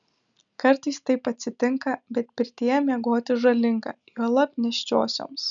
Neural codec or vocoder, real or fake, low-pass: none; real; 7.2 kHz